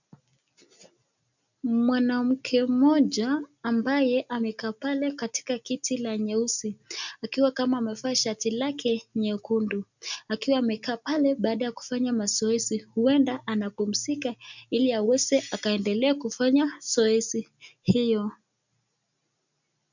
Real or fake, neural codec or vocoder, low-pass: real; none; 7.2 kHz